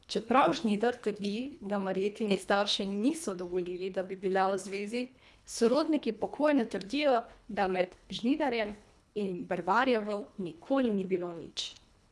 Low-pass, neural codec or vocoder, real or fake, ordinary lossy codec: none; codec, 24 kHz, 1.5 kbps, HILCodec; fake; none